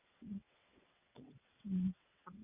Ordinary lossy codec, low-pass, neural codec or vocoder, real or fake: none; 3.6 kHz; vocoder, 22.05 kHz, 80 mel bands, WaveNeXt; fake